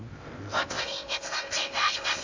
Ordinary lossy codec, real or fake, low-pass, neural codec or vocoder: MP3, 64 kbps; fake; 7.2 kHz; codec, 16 kHz in and 24 kHz out, 0.6 kbps, FocalCodec, streaming, 2048 codes